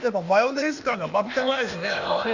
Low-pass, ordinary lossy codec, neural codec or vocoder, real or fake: 7.2 kHz; none; codec, 16 kHz, 0.8 kbps, ZipCodec; fake